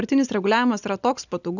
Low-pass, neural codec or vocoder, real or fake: 7.2 kHz; none; real